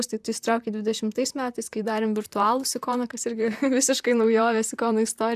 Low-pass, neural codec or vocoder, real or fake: 14.4 kHz; vocoder, 44.1 kHz, 128 mel bands, Pupu-Vocoder; fake